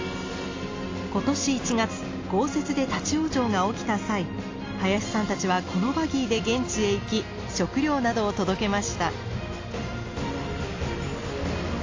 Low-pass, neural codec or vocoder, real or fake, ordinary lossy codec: 7.2 kHz; none; real; AAC, 32 kbps